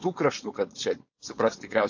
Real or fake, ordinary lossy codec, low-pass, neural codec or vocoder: fake; AAC, 48 kbps; 7.2 kHz; codec, 16 kHz, 4.8 kbps, FACodec